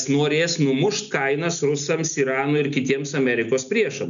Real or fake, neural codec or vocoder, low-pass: real; none; 7.2 kHz